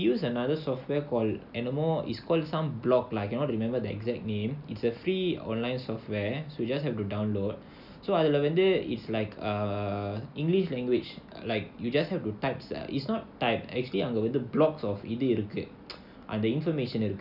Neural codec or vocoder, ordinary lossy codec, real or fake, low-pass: none; none; real; 5.4 kHz